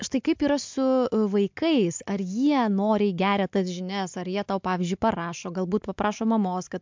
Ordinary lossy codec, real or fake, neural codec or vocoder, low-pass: MP3, 64 kbps; real; none; 7.2 kHz